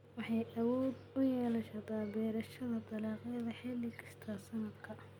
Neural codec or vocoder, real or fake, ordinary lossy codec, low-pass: none; real; MP3, 96 kbps; 19.8 kHz